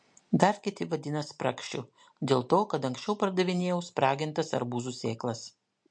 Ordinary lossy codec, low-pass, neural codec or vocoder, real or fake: MP3, 48 kbps; 9.9 kHz; none; real